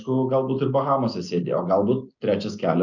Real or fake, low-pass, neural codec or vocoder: real; 7.2 kHz; none